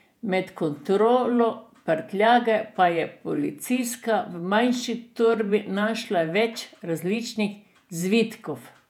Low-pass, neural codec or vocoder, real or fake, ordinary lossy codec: 19.8 kHz; vocoder, 48 kHz, 128 mel bands, Vocos; fake; none